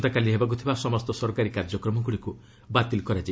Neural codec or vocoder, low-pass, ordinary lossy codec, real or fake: none; none; none; real